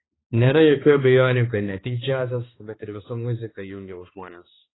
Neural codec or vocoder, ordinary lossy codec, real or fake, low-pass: codec, 16 kHz, 2 kbps, X-Codec, HuBERT features, trained on balanced general audio; AAC, 16 kbps; fake; 7.2 kHz